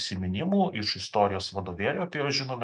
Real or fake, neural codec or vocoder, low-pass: fake; autoencoder, 48 kHz, 128 numbers a frame, DAC-VAE, trained on Japanese speech; 10.8 kHz